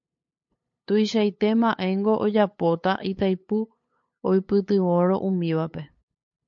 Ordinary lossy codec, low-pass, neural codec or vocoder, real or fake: MP3, 48 kbps; 7.2 kHz; codec, 16 kHz, 8 kbps, FunCodec, trained on LibriTTS, 25 frames a second; fake